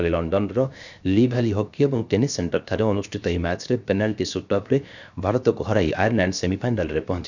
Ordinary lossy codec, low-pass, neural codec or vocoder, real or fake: none; 7.2 kHz; codec, 16 kHz, 0.7 kbps, FocalCodec; fake